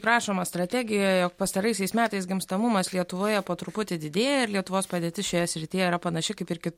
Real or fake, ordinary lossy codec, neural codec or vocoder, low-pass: real; MP3, 64 kbps; none; 19.8 kHz